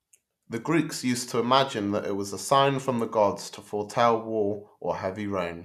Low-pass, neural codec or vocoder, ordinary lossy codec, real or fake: 14.4 kHz; none; none; real